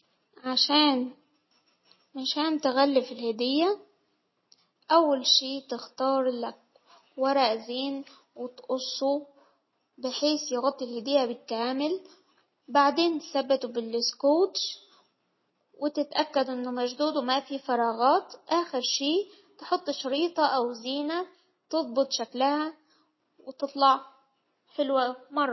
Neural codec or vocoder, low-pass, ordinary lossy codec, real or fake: none; 7.2 kHz; MP3, 24 kbps; real